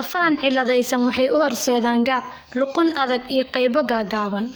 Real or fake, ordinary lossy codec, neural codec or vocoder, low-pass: fake; none; codec, 44.1 kHz, 2.6 kbps, SNAC; none